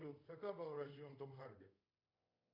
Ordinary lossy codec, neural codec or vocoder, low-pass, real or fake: Opus, 64 kbps; codec, 24 kHz, 0.5 kbps, DualCodec; 5.4 kHz; fake